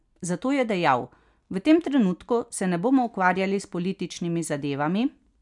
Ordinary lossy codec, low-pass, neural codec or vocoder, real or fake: none; 10.8 kHz; none; real